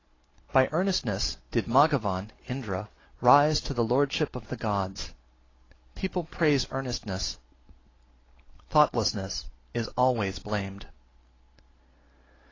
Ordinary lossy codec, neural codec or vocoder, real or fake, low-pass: AAC, 32 kbps; none; real; 7.2 kHz